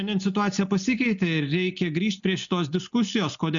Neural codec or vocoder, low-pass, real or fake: none; 7.2 kHz; real